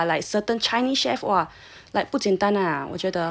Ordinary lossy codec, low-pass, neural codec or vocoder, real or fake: none; none; none; real